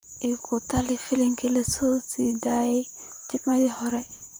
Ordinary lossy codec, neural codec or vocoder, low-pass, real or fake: none; vocoder, 44.1 kHz, 128 mel bands, Pupu-Vocoder; none; fake